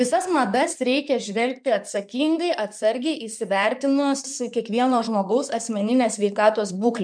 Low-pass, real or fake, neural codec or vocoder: 9.9 kHz; fake; codec, 16 kHz in and 24 kHz out, 2.2 kbps, FireRedTTS-2 codec